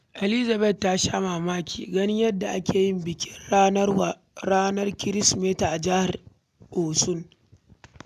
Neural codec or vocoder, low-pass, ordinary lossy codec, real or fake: none; 14.4 kHz; none; real